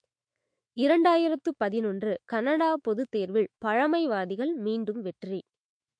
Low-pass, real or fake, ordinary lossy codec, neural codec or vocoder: 9.9 kHz; fake; MP3, 48 kbps; autoencoder, 48 kHz, 128 numbers a frame, DAC-VAE, trained on Japanese speech